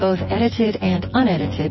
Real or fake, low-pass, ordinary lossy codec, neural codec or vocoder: fake; 7.2 kHz; MP3, 24 kbps; vocoder, 24 kHz, 100 mel bands, Vocos